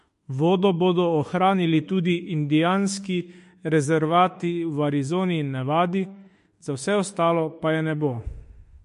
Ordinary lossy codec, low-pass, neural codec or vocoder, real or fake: MP3, 48 kbps; 14.4 kHz; autoencoder, 48 kHz, 32 numbers a frame, DAC-VAE, trained on Japanese speech; fake